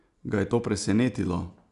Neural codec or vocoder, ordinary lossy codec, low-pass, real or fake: none; none; 10.8 kHz; real